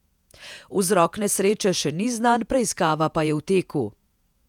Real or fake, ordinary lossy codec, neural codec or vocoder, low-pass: fake; none; vocoder, 48 kHz, 128 mel bands, Vocos; 19.8 kHz